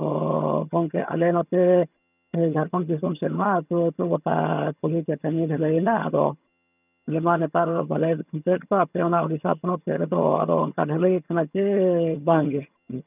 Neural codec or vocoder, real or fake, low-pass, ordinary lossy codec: vocoder, 22.05 kHz, 80 mel bands, HiFi-GAN; fake; 3.6 kHz; none